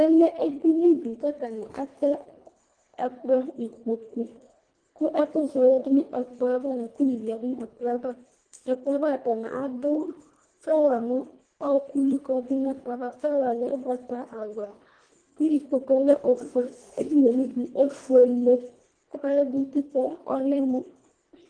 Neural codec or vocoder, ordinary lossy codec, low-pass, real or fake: codec, 24 kHz, 1.5 kbps, HILCodec; Opus, 24 kbps; 9.9 kHz; fake